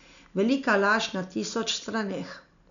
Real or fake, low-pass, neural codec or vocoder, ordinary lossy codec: real; 7.2 kHz; none; MP3, 96 kbps